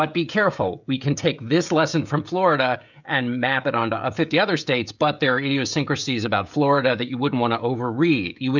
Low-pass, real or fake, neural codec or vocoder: 7.2 kHz; fake; codec, 16 kHz, 16 kbps, FreqCodec, smaller model